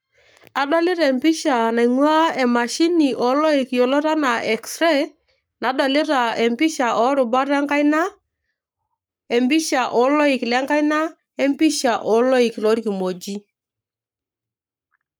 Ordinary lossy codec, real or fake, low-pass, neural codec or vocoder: none; fake; none; codec, 44.1 kHz, 7.8 kbps, Pupu-Codec